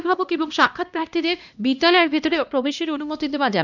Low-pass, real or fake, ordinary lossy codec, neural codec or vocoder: 7.2 kHz; fake; none; codec, 16 kHz, 1 kbps, X-Codec, HuBERT features, trained on LibriSpeech